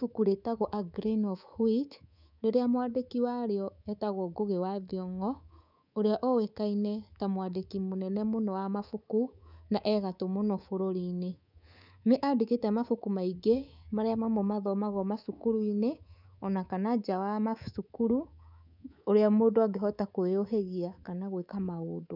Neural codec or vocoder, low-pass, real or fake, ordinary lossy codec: codec, 24 kHz, 3.1 kbps, DualCodec; 5.4 kHz; fake; none